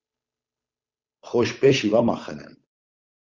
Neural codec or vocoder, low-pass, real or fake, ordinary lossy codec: codec, 16 kHz, 8 kbps, FunCodec, trained on Chinese and English, 25 frames a second; 7.2 kHz; fake; Opus, 64 kbps